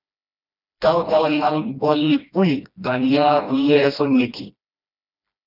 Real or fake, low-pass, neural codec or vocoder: fake; 5.4 kHz; codec, 16 kHz, 1 kbps, FreqCodec, smaller model